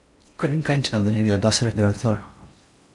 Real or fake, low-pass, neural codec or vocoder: fake; 10.8 kHz; codec, 16 kHz in and 24 kHz out, 0.6 kbps, FocalCodec, streaming, 4096 codes